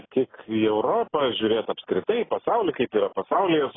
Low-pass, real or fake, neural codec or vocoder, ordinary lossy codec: 7.2 kHz; real; none; AAC, 16 kbps